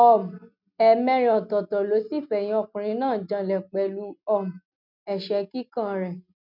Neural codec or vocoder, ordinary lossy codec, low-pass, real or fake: none; none; 5.4 kHz; real